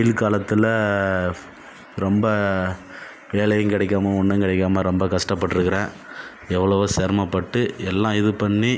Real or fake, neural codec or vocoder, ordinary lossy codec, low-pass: real; none; none; none